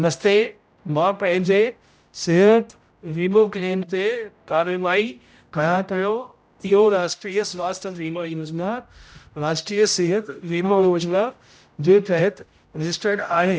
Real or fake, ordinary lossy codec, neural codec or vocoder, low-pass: fake; none; codec, 16 kHz, 0.5 kbps, X-Codec, HuBERT features, trained on general audio; none